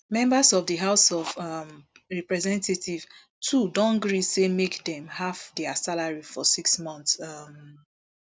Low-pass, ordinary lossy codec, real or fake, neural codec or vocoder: none; none; real; none